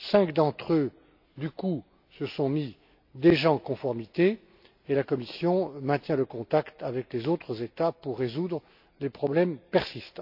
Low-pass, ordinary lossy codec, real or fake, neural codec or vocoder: 5.4 kHz; AAC, 48 kbps; real; none